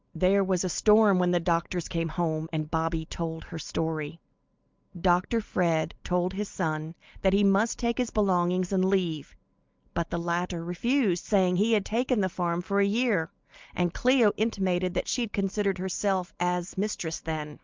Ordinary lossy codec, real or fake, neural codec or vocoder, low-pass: Opus, 24 kbps; real; none; 7.2 kHz